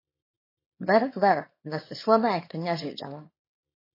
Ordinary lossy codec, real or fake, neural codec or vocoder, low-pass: MP3, 24 kbps; fake; codec, 24 kHz, 0.9 kbps, WavTokenizer, small release; 5.4 kHz